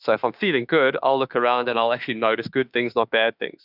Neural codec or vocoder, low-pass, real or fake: autoencoder, 48 kHz, 32 numbers a frame, DAC-VAE, trained on Japanese speech; 5.4 kHz; fake